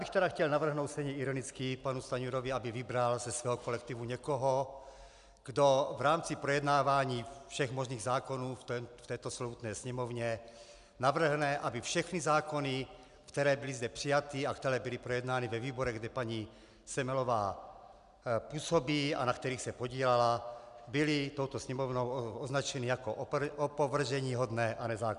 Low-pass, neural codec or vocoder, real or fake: 10.8 kHz; none; real